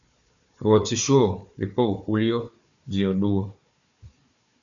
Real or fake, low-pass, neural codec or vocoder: fake; 7.2 kHz; codec, 16 kHz, 4 kbps, FunCodec, trained on Chinese and English, 50 frames a second